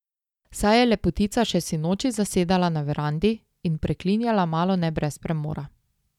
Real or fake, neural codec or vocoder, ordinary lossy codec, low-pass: real; none; none; 19.8 kHz